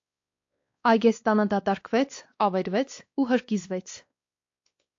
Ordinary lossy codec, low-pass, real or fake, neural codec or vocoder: AAC, 48 kbps; 7.2 kHz; fake; codec, 16 kHz, 2 kbps, X-Codec, WavLM features, trained on Multilingual LibriSpeech